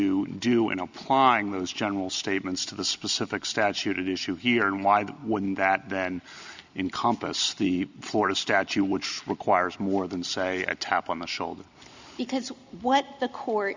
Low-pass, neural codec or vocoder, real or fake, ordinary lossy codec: 7.2 kHz; none; real; Opus, 64 kbps